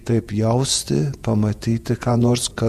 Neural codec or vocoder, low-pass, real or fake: vocoder, 48 kHz, 128 mel bands, Vocos; 14.4 kHz; fake